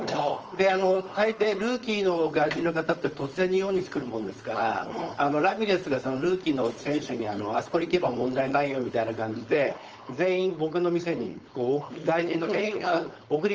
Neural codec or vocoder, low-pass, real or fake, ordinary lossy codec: codec, 16 kHz, 4.8 kbps, FACodec; 7.2 kHz; fake; Opus, 24 kbps